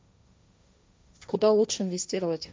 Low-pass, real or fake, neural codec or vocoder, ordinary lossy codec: none; fake; codec, 16 kHz, 1.1 kbps, Voila-Tokenizer; none